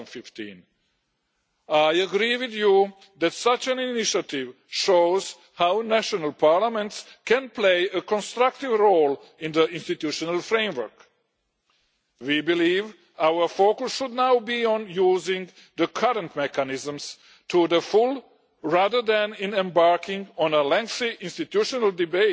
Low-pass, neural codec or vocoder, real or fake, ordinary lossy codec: none; none; real; none